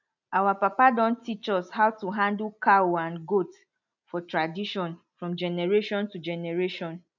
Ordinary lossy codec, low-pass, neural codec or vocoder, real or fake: none; 7.2 kHz; none; real